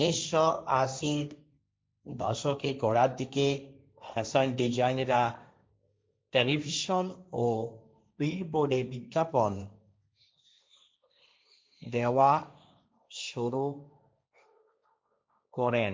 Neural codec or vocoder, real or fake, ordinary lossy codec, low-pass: codec, 16 kHz, 1.1 kbps, Voila-Tokenizer; fake; none; none